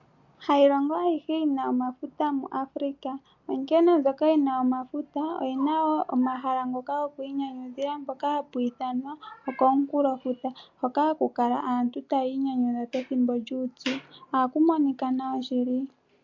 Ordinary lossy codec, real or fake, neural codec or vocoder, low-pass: MP3, 48 kbps; real; none; 7.2 kHz